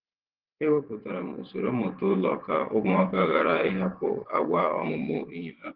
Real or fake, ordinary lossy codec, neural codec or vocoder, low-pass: fake; Opus, 16 kbps; vocoder, 22.05 kHz, 80 mel bands, WaveNeXt; 5.4 kHz